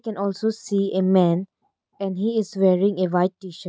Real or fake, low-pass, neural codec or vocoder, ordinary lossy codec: real; none; none; none